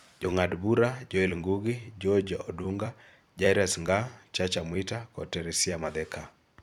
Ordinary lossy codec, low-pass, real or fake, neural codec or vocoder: none; 19.8 kHz; fake; vocoder, 44.1 kHz, 128 mel bands every 256 samples, BigVGAN v2